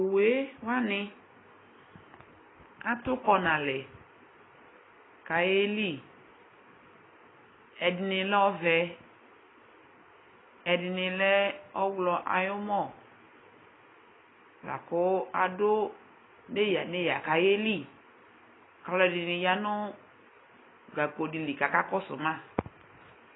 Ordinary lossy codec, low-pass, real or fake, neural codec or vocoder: AAC, 16 kbps; 7.2 kHz; real; none